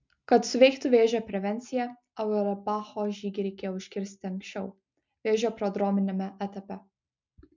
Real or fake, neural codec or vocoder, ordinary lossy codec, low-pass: real; none; MP3, 64 kbps; 7.2 kHz